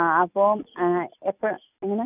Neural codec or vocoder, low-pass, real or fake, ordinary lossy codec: none; 3.6 kHz; real; none